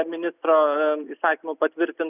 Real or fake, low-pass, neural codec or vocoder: real; 3.6 kHz; none